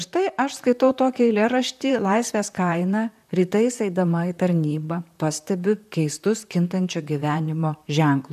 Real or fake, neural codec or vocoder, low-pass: fake; vocoder, 44.1 kHz, 128 mel bands, Pupu-Vocoder; 14.4 kHz